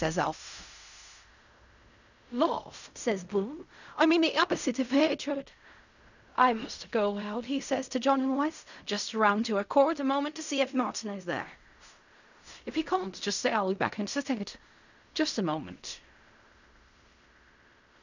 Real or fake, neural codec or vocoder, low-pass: fake; codec, 16 kHz in and 24 kHz out, 0.4 kbps, LongCat-Audio-Codec, fine tuned four codebook decoder; 7.2 kHz